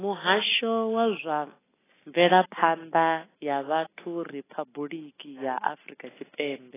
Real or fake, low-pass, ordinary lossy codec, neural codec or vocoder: real; 3.6 kHz; AAC, 16 kbps; none